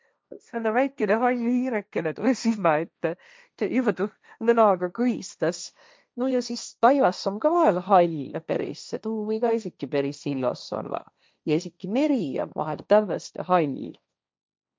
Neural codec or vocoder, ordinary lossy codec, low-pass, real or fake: codec, 16 kHz, 1.1 kbps, Voila-Tokenizer; none; none; fake